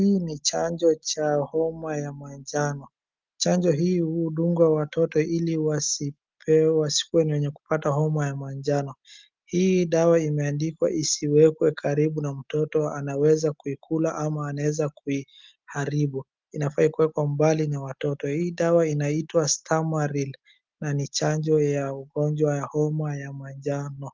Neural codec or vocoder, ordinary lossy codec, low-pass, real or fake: none; Opus, 24 kbps; 7.2 kHz; real